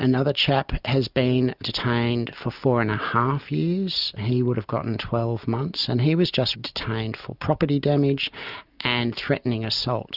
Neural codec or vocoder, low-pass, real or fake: none; 5.4 kHz; real